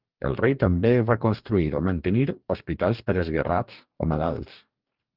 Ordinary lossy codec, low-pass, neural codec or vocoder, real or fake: Opus, 32 kbps; 5.4 kHz; codec, 44.1 kHz, 2.6 kbps, DAC; fake